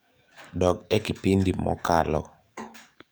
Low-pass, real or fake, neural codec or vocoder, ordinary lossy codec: none; fake; codec, 44.1 kHz, 7.8 kbps, DAC; none